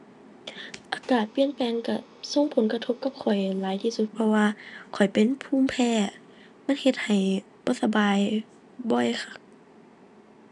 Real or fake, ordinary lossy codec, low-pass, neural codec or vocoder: real; none; 10.8 kHz; none